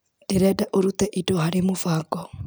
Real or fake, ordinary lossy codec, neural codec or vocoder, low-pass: real; none; none; none